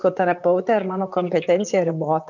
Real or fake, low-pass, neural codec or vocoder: fake; 7.2 kHz; vocoder, 44.1 kHz, 128 mel bands, Pupu-Vocoder